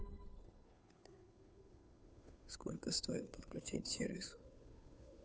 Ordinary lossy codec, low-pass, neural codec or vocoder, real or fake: none; none; codec, 16 kHz, 2 kbps, FunCodec, trained on Chinese and English, 25 frames a second; fake